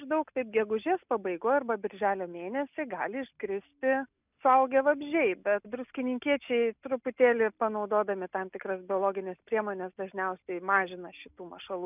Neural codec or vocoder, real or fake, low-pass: none; real; 3.6 kHz